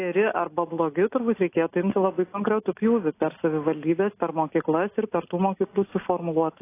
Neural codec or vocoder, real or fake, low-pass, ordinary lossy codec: none; real; 3.6 kHz; AAC, 24 kbps